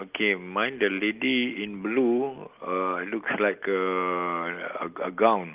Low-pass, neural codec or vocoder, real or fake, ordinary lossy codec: 3.6 kHz; none; real; Opus, 32 kbps